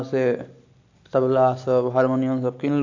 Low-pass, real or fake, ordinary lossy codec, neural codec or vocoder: 7.2 kHz; real; AAC, 48 kbps; none